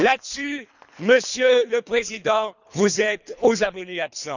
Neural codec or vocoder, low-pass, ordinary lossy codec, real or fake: codec, 24 kHz, 3 kbps, HILCodec; 7.2 kHz; none; fake